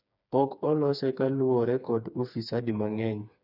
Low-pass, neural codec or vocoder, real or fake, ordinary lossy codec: 5.4 kHz; codec, 16 kHz, 4 kbps, FreqCodec, smaller model; fake; none